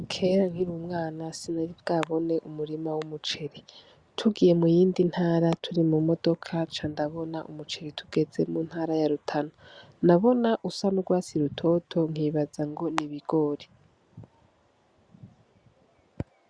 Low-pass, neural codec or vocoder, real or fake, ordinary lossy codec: 9.9 kHz; none; real; Opus, 64 kbps